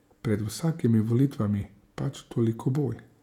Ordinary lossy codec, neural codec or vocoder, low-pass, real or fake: none; vocoder, 48 kHz, 128 mel bands, Vocos; 19.8 kHz; fake